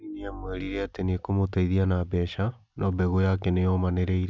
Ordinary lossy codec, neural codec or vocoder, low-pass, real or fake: none; none; none; real